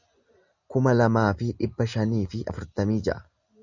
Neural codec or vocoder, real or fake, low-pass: none; real; 7.2 kHz